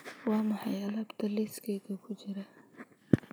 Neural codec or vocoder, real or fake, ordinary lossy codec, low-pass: none; real; none; none